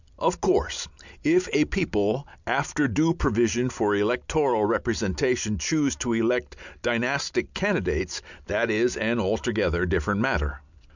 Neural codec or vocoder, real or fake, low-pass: none; real; 7.2 kHz